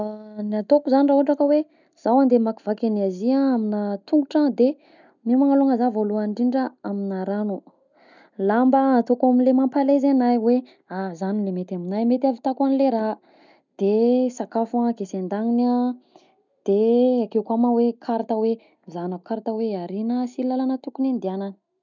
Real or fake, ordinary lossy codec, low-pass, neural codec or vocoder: real; none; 7.2 kHz; none